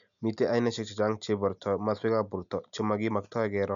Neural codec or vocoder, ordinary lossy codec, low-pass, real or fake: none; none; 7.2 kHz; real